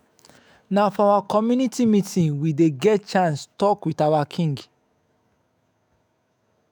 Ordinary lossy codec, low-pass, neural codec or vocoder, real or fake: none; none; autoencoder, 48 kHz, 128 numbers a frame, DAC-VAE, trained on Japanese speech; fake